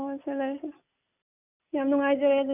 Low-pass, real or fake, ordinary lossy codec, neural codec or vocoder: 3.6 kHz; real; none; none